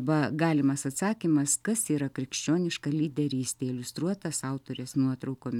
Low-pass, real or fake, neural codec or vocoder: 19.8 kHz; real; none